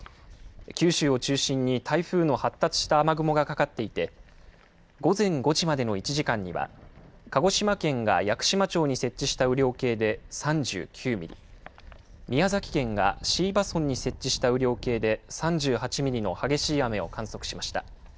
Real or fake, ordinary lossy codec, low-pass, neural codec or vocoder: real; none; none; none